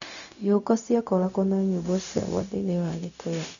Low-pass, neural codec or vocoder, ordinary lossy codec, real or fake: 7.2 kHz; codec, 16 kHz, 0.4 kbps, LongCat-Audio-Codec; none; fake